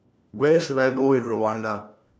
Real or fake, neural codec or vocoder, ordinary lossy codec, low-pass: fake; codec, 16 kHz, 1 kbps, FunCodec, trained on LibriTTS, 50 frames a second; none; none